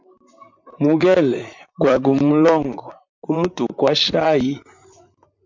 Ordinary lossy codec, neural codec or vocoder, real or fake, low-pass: MP3, 64 kbps; vocoder, 44.1 kHz, 128 mel bands, Pupu-Vocoder; fake; 7.2 kHz